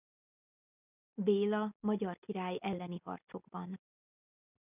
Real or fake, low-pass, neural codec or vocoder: real; 3.6 kHz; none